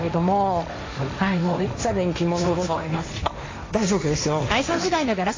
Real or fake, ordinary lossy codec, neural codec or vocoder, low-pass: fake; AAC, 32 kbps; codec, 16 kHz, 1.1 kbps, Voila-Tokenizer; 7.2 kHz